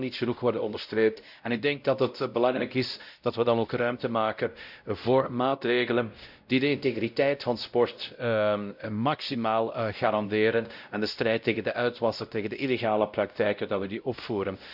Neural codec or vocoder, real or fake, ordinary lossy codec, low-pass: codec, 16 kHz, 0.5 kbps, X-Codec, WavLM features, trained on Multilingual LibriSpeech; fake; none; 5.4 kHz